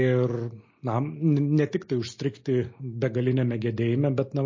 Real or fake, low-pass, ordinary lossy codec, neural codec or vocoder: real; 7.2 kHz; MP3, 32 kbps; none